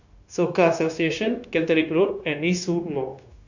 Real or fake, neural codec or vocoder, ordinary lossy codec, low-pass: fake; codec, 16 kHz, 0.9 kbps, LongCat-Audio-Codec; none; 7.2 kHz